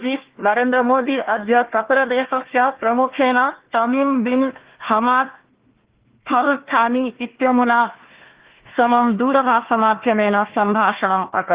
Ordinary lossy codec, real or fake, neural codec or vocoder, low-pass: Opus, 16 kbps; fake; codec, 16 kHz, 1 kbps, FunCodec, trained on Chinese and English, 50 frames a second; 3.6 kHz